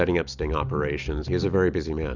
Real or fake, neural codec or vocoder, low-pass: real; none; 7.2 kHz